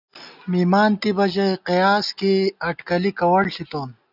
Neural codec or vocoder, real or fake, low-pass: none; real; 5.4 kHz